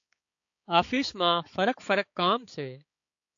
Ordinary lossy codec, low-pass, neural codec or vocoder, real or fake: AAC, 48 kbps; 7.2 kHz; codec, 16 kHz, 4 kbps, X-Codec, HuBERT features, trained on balanced general audio; fake